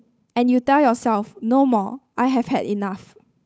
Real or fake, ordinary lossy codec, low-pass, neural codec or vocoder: fake; none; none; codec, 16 kHz, 8 kbps, FunCodec, trained on Chinese and English, 25 frames a second